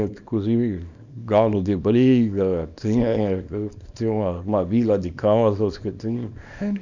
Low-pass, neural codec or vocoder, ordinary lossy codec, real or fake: 7.2 kHz; codec, 24 kHz, 0.9 kbps, WavTokenizer, small release; none; fake